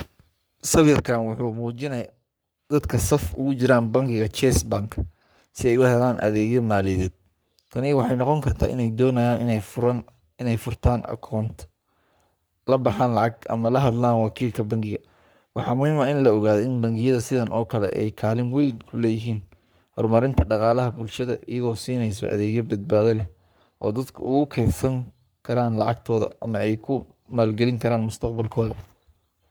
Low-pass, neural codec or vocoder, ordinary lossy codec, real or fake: none; codec, 44.1 kHz, 3.4 kbps, Pupu-Codec; none; fake